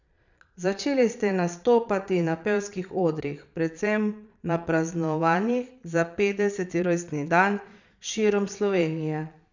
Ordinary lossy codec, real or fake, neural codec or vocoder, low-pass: none; fake; vocoder, 22.05 kHz, 80 mel bands, Vocos; 7.2 kHz